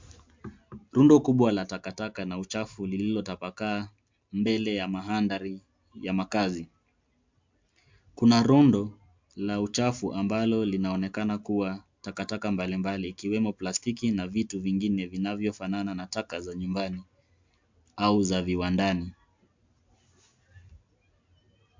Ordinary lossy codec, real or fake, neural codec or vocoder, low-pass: MP3, 64 kbps; real; none; 7.2 kHz